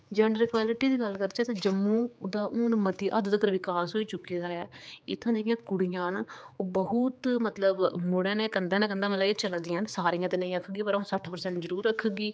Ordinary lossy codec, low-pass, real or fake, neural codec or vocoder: none; none; fake; codec, 16 kHz, 4 kbps, X-Codec, HuBERT features, trained on general audio